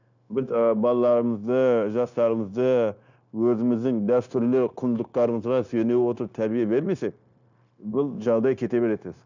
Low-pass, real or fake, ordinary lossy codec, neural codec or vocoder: 7.2 kHz; fake; none; codec, 16 kHz, 0.9 kbps, LongCat-Audio-Codec